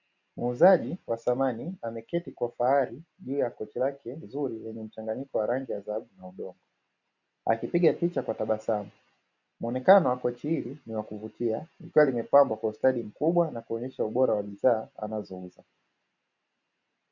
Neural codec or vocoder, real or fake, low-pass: none; real; 7.2 kHz